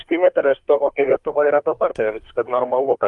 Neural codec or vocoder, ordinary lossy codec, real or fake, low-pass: codec, 24 kHz, 1 kbps, SNAC; Opus, 32 kbps; fake; 10.8 kHz